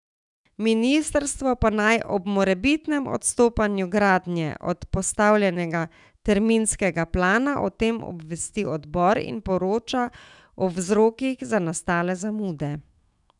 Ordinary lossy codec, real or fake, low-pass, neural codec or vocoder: none; fake; 10.8 kHz; autoencoder, 48 kHz, 128 numbers a frame, DAC-VAE, trained on Japanese speech